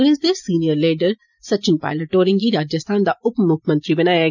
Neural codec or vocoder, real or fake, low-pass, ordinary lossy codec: none; real; 7.2 kHz; none